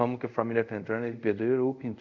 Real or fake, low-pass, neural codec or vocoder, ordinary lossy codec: fake; 7.2 kHz; codec, 24 kHz, 0.5 kbps, DualCodec; none